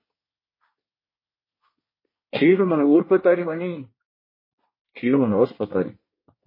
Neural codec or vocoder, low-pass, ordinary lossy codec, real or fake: codec, 24 kHz, 1 kbps, SNAC; 5.4 kHz; MP3, 24 kbps; fake